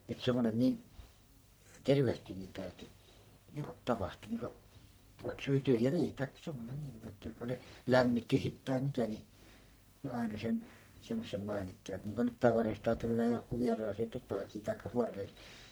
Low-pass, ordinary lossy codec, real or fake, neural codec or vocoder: none; none; fake; codec, 44.1 kHz, 1.7 kbps, Pupu-Codec